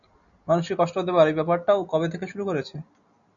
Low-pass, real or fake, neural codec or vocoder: 7.2 kHz; real; none